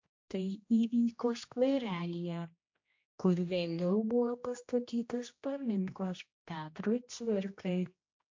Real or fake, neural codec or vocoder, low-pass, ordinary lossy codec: fake; codec, 16 kHz, 1 kbps, X-Codec, HuBERT features, trained on general audio; 7.2 kHz; MP3, 48 kbps